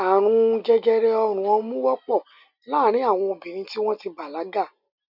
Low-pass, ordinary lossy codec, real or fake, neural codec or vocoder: 5.4 kHz; none; real; none